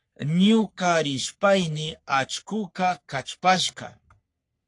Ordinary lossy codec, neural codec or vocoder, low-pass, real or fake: AAC, 48 kbps; codec, 44.1 kHz, 3.4 kbps, Pupu-Codec; 10.8 kHz; fake